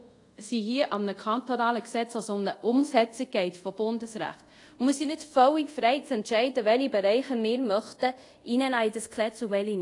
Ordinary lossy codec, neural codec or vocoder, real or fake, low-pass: AAC, 48 kbps; codec, 24 kHz, 0.5 kbps, DualCodec; fake; 10.8 kHz